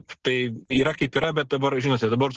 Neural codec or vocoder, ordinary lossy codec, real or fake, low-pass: none; Opus, 16 kbps; real; 7.2 kHz